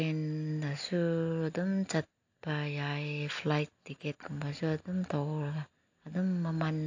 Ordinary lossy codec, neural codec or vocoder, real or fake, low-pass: AAC, 48 kbps; none; real; 7.2 kHz